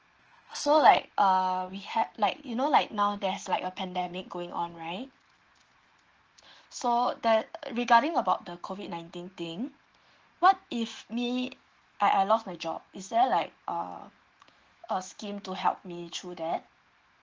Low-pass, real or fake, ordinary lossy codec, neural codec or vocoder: 7.2 kHz; fake; Opus, 16 kbps; autoencoder, 48 kHz, 128 numbers a frame, DAC-VAE, trained on Japanese speech